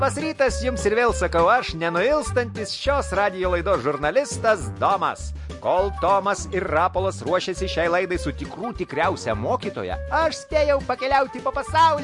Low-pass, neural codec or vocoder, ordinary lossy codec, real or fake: 10.8 kHz; none; MP3, 48 kbps; real